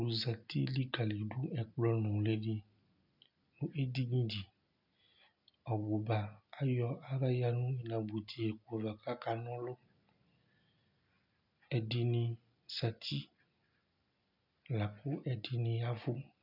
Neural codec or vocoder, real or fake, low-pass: none; real; 5.4 kHz